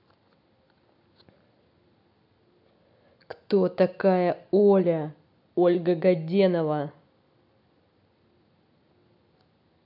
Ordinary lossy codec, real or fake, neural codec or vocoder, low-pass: none; real; none; 5.4 kHz